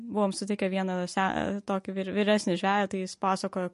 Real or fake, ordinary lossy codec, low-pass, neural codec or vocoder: real; MP3, 48 kbps; 14.4 kHz; none